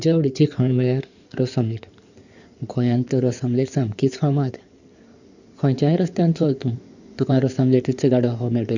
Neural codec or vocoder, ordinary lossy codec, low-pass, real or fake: codec, 16 kHz in and 24 kHz out, 2.2 kbps, FireRedTTS-2 codec; none; 7.2 kHz; fake